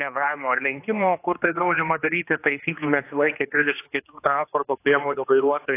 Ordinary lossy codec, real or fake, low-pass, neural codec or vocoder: AAC, 24 kbps; fake; 3.6 kHz; codec, 16 kHz, 2 kbps, X-Codec, HuBERT features, trained on general audio